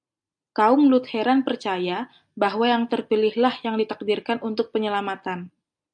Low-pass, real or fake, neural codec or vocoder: 9.9 kHz; real; none